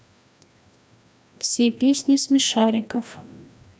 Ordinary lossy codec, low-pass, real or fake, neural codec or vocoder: none; none; fake; codec, 16 kHz, 1 kbps, FreqCodec, larger model